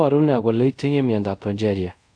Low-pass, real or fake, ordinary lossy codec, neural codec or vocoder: 9.9 kHz; fake; none; codec, 24 kHz, 0.5 kbps, DualCodec